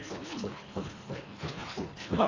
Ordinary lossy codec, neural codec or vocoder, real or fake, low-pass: AAC, 48 kbps; codec, 24 kHz, 1.5 kbps, HILCodec; fake; 7.2 kHz